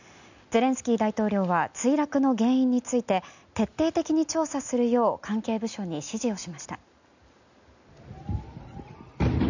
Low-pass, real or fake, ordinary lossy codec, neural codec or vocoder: 7.2 kHz; real; none; none